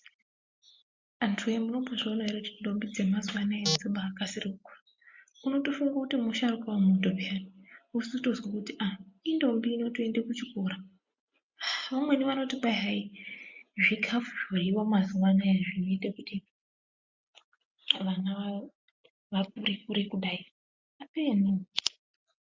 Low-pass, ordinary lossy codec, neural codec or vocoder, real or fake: 7.2 kHz; MP3, 64 kbps; none; real